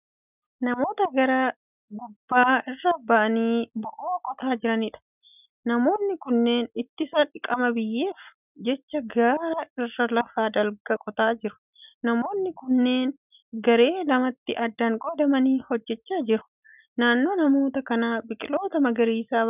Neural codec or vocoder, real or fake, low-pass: none; real; 3.6 kHz